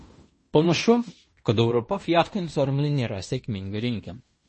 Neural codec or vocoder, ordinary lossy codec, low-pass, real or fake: codec, 16 kHz in and 24 kHz out, 0.9 kbps, LongCat-Audio-Codec, fine tuned four codebook decoder; MP3, 32 kbps; 10.8 kHz; fake